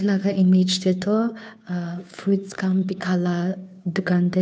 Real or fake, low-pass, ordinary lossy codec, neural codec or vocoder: fake; none; none; codec, 16 kHz, 2 kbps, FunCodec, trained on Chinese and English, 25 frames a second